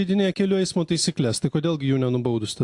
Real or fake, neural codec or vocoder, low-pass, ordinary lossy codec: real; none; 10.8 kHz; AAC, 64 kbps